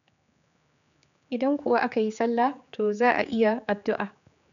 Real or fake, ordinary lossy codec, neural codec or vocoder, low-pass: fake; none; codec, 16 kHz, 4 kbps, X-Codec, HuBERT features, trained on general audio; 7.2 kHz